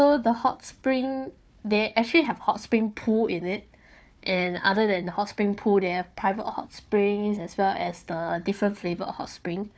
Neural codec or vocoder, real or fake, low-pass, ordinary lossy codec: codec, 16 kHz, 4 kbps, FunCodec, trained on Chinese and English, 50 frames a second; fake; none; none